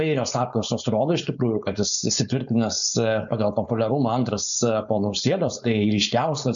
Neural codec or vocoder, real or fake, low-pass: codec, 16 kHz, 4.8 kbps, FACodec; fake; 7.2 kHz